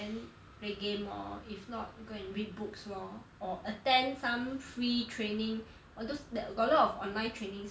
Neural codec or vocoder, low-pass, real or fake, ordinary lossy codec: none; none; real; none